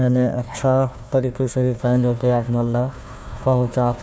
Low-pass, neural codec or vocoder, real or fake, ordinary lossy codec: none; codec, 16 kHz, 1 kbps, FunCodec, trained on Chinese and English, 50 frames a second; fake; none